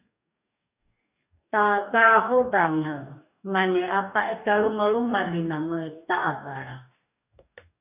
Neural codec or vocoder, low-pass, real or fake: codec, 44.1 kHz, 2.6 kbps, DAC; 3.6 kHz; fake